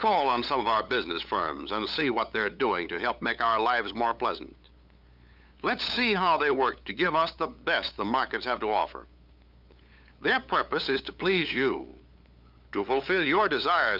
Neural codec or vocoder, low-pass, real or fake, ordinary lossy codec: codec, 16 kHz, 8 kbps, FunCodec, trained on LibriTTS, 25 frames a second; 5.4 kHz; fake; Opus, 64 kbps